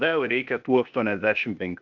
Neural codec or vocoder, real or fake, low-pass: codec, 16 kHz, 0.8 kbps, ZipCodec; fake; 7.2 kHz